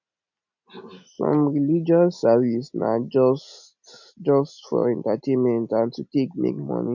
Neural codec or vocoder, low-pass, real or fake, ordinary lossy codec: none; 7.2 kHz; real; none